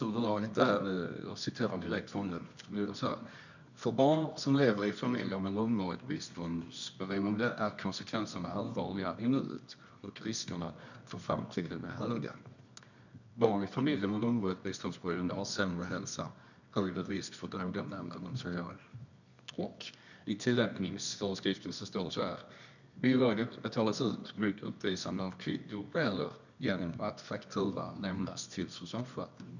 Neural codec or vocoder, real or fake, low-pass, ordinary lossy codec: codec, 24 kHz, 0.9 kbps, WavTokenizer, medium music audio release; fake; 7.2 kHz; none